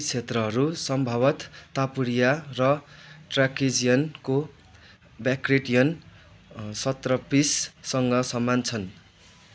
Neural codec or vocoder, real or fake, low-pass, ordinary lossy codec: none; real; none; none